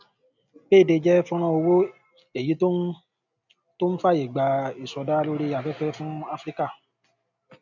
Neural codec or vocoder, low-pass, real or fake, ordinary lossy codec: none; 7.2 kHz; real; none